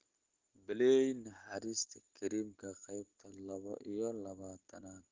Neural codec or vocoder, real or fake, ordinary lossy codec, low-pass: none; real; Opus, 16 kbps; 7.2 kHz